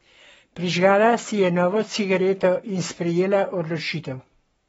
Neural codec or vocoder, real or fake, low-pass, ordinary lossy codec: codec, 44.1 kHz, 7.8 kbps, Pupu-Codec; fake; 19.8 kHz; AAC, 24 kbps